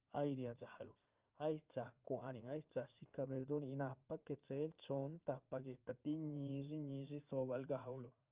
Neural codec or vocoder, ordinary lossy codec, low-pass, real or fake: vocoder, 44.1 kHz, 80 mel bands, Vocos; Opus, 32 kbps; 3.6 kHz; fake